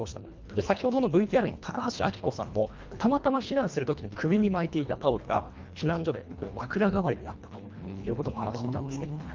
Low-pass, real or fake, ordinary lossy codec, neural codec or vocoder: 7.2 kHz; fake; Opus, 32 kbps; codec, 24 kHz, 1.5 kbps, HILCodec